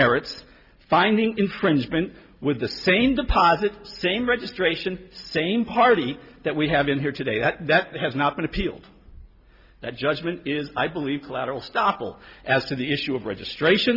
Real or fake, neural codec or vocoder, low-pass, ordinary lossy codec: real; none; 5.4 kHz; Opus, 64 kbps